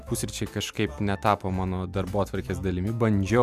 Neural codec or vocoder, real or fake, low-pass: none; real; 14.4 kHz